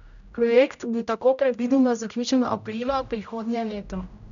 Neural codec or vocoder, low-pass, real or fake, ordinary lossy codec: codec, 16 kHz, 0.5 kbps, X-Codec, HuBERT features, trained on general audio; 7.2 kHz; fake; none